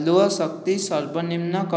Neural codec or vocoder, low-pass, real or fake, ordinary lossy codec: none; none; real; none